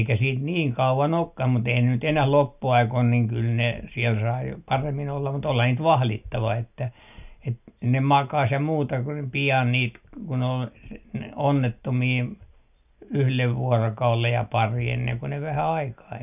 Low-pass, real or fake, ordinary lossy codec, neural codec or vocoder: 3.6 kHz; real; none; none